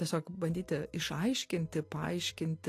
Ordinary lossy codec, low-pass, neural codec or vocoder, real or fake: AAC, 48 kbps; 14.4 kHz; none; real